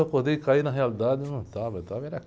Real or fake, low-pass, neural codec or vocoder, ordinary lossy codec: real; none; none; none